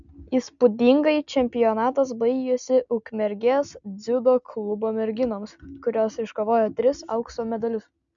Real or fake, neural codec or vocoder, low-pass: real; none; 7.2 kHz